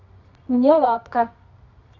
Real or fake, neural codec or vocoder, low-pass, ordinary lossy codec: fake; codec, 24 kHz, 0.9 kbps, WavTokenizer, medium music audio release; 7.2 kHz; none